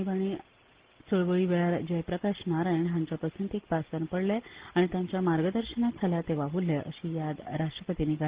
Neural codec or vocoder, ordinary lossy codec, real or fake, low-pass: none; Opus, 16 kbps; real; 3.6 kHz